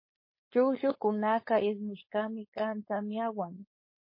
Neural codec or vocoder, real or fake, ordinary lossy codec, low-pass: codec, 16 kHz, 4.8 kbps, FACodec; fake; MP3, 24 kbps; 5.4 kHz